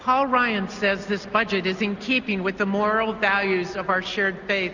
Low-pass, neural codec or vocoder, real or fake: 7.2 kHz; none; real